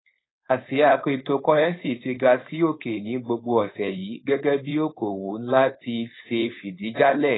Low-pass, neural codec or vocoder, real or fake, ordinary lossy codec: 7.2 kHz; codec, 16 kHz, 4.8 kbps, FACodec; fake; AAC, 16 kbps